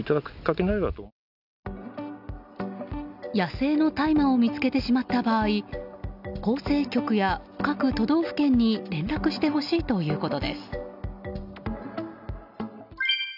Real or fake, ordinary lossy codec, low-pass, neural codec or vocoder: real; none; 5.4 kHz; none